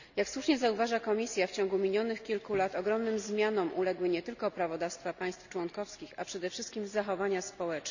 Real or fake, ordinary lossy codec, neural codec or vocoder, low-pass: real; none; none; 7.2 kHz